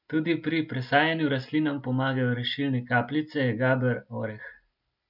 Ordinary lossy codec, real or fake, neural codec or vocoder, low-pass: none; real; none; 5.4 kHz